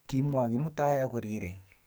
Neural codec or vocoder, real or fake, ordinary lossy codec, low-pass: codec, 44.1 kHz, 2.6 kbps, SNAC; fake; none; none